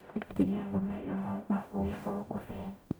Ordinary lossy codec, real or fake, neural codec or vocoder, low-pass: none; fake; codec, 44.1 kHz, 0.9 kbps, DAC; none